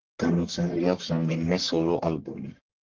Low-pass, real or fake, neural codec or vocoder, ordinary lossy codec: 7.2 kHz; fake; codec, 44.1 kHz, 1.7 kbps, Pupu-Codec; Opus, 16 kbps